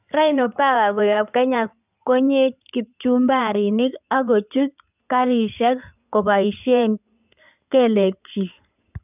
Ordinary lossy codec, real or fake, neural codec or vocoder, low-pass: none; fake; codec, 16 kHz in and 24 kHz out, 2.2 kbps, FireRedTTS-2 codec; 3.6 kHz